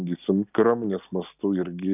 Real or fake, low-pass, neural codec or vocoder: real; 3.6 kHz; none